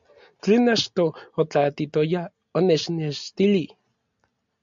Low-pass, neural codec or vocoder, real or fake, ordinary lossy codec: 7.2 kHz; none; real; MP3, 64 kbps